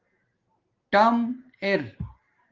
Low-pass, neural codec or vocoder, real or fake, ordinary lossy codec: 7.2 kHz; none; real; Opus, 16 kbps